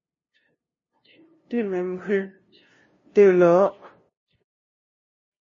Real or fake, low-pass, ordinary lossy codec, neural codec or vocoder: fake; 7.2 kHz; MP3, 32 kbps; codec, 16 kHz, 0.5 kbps, FunCodec, trained on LibriTTS, 25 frames a second